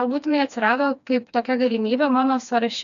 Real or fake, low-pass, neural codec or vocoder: fake; 7.2 kHz; codec, 16 kHz, 2 kbps, FreqCodec, smaller model